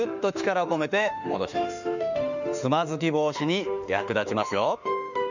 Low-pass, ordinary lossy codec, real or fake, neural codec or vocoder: 7.2 kHz; none; fake; autoencoder, 48 kHz, 32 numbers a frame, DAC-VAE, trained on Japanese speech